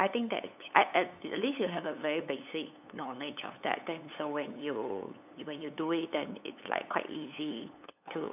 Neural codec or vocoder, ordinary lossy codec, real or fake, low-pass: codec, 16 kHz, 8 kbps, FunCodec, trained on LibriTTS, 25 frames a second; none; fake; 3.6 kHz